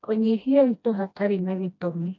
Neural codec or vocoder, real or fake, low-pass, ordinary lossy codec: codec, 16 kHz, 1 kbps, FreqCodec, smaller model; fake; 7.2 kHz; none